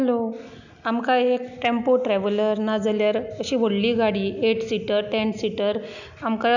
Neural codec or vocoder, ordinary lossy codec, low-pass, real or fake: none; none; 7.2 kHz; real